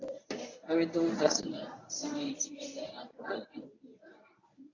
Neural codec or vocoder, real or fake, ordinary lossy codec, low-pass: codec, 24 kHz, 0.9 kbps, WavTokenizer, medium speech release version 1; fake; AAC, 32 kbps; 7.2 kHz